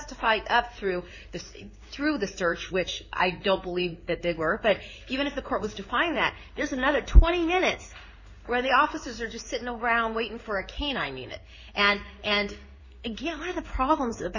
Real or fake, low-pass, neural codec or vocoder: fake; 7.2 kHz; codec, 16 kHz in and 24 kHz out, 1 kbps, XY-Tokenizer